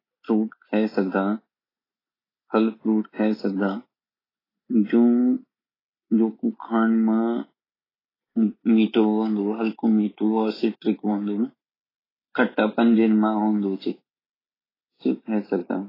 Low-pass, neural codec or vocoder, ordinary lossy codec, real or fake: 5.4 kHz; none; AAC, 24 kbps; real